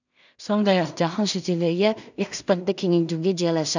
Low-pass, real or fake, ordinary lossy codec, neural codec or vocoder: 7.2 kHz; fake; none; codec, 16 kHz in and 24 kHz out, 0.4 kbps, LongCat-Audio-Codec, two codebook decoder